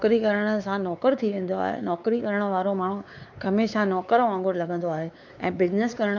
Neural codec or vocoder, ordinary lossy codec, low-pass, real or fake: codec, 16 kHz, 4 kbps, X-Codec, WavLM features, trained on Multilingual LibriSpeech; none; 7.2 kHz; fake